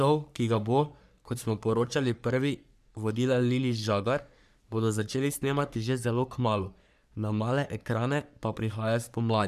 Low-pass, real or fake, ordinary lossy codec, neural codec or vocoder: 14.4 kHz; fake; none; codec, 44.1 kHz, 3.4 kbps, Pupu-Codec